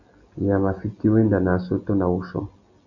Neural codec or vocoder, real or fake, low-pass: none; real; 7.2 kHz